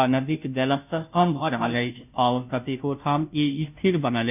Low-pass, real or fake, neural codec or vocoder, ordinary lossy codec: 3.6 kHz; fake; codec, 16 kHz, 0.5 kbps, FunCodec, trained on Chinese and English, 25 frames a second; none